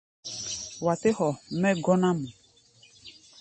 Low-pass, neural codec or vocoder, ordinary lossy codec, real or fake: 10.8 kHz; none; MP3, 32 kbps; real